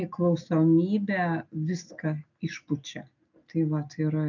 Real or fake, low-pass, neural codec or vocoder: real; 7.2 kHz; none